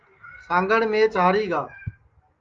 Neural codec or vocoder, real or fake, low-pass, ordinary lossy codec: none; real; 7.2 kHz; Opus, 32 kbps